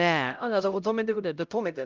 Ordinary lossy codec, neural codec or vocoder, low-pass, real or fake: Opus, 24 kbps; codec, 16 kHz, 0.5 kbps, X-Codec, HuBERT features, trained on LibriSpeech; 7.2 kHz; fake